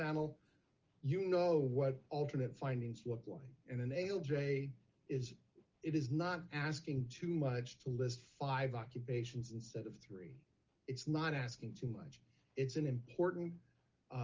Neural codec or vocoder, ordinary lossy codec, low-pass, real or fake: none; Opus, 24 kbps; 7.2 kHz; real